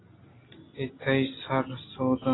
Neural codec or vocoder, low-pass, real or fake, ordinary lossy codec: none; 7.2 kHz; real; AAC, 16 kbps